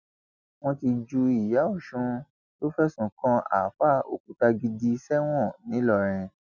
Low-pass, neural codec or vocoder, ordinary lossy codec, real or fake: 7.2 kHz; none; none; real